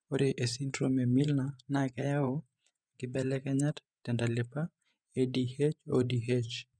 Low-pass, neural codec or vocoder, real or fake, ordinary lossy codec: 9.9 kHz; vocoder, 24 kHz, 100 mel bands, Vocos; fake; none